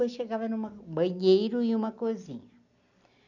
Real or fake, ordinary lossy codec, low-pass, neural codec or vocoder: real; none; 7.2 kHz; none